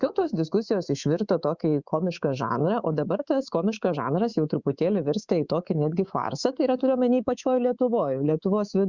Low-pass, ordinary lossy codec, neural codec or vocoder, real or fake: 7.2 kHz; Opus, 64 kbps; codec, 24 kHz, 3.1 kbps, DualCodec; fake